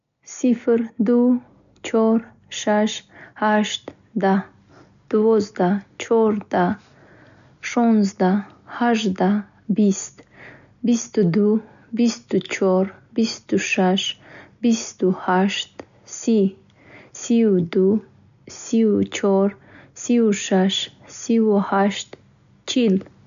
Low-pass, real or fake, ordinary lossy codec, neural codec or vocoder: 7.2 kHz; real; none; none